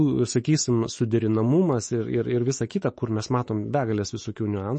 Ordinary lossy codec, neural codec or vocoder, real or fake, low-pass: MP3, 32 kbps; autoencoder, 48 kHz, 128 numbers a frame, DAC-VAE, trained on Japanese speech; fake; 10.8 kHz